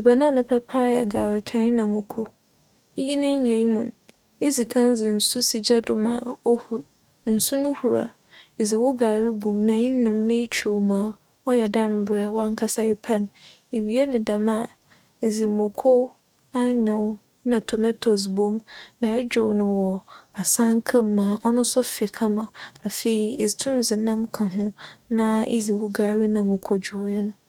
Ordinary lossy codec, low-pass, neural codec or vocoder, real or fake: none; 19.8 kHz; codec, 44.1 kHz, 2.6 kbps, DAC; fake